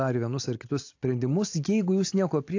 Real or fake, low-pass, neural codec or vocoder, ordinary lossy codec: real; 7.2 kHz; none; AAC, 48 kbps